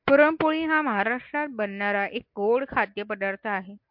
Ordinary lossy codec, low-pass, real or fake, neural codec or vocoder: MP3, 48 kbps; 5.4 kHz; real; none